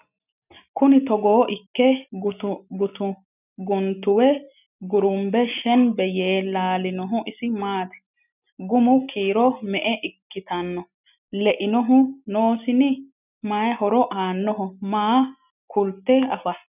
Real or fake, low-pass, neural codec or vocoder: real; 3.6 kHz; none